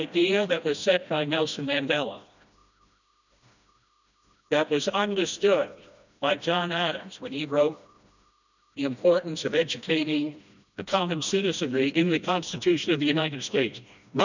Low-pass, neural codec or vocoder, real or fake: 7.2 kHz; codec, 16 kHz, 1 kbps, FreqCodec, smaller model; fake